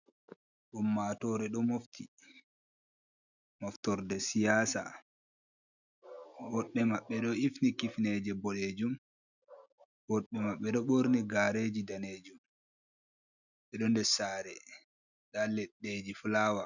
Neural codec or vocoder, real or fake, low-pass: none; real; 7.2 kHz